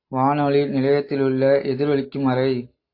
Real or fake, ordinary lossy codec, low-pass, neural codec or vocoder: real; AAC, 32 kbps; 5.4 kHz; none